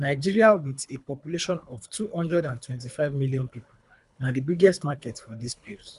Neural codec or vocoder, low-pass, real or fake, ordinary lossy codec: codec, 24 kHz, 3 kbps, HILCodec; 10.8 kHz; fake; none